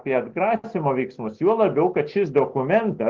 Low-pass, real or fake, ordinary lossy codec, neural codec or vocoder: 7.2 kHz; real; Opus, 16 kbps; none